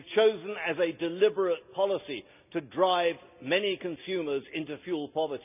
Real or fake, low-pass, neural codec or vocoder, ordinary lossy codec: real; 3.6 kHz; none; none